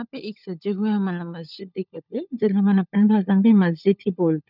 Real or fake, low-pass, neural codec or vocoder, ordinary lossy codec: fake; 5.4 kHz; codec, 16 kHz, 16 kbps, FunCodec, trained on LibriTTS, 50 frames a second; none